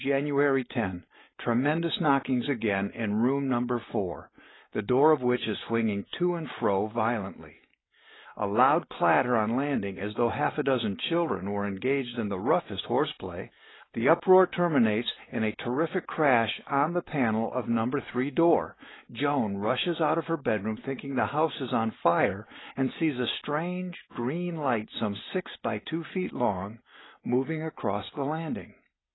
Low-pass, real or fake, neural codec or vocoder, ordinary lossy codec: 7.2 kHz; real; none; AAC, 16 kbps